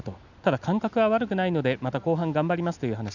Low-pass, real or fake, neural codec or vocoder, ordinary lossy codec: 7.2 kHz; real; none; none